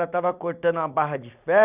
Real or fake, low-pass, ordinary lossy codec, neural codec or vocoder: fake; 3.6 kHz; none; vocoder, 44.1 kHz, 128 mel bands every 256 samples, BigVGAN v2